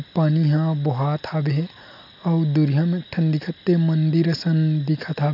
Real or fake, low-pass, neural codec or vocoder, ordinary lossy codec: real; 5.4 kHz; none; none